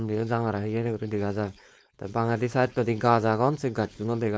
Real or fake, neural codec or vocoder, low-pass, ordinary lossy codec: fake; codec, 16 kHz, 4.8 kbps, FACodec; none; none